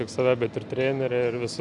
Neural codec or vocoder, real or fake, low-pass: none; real; 10.8 kHz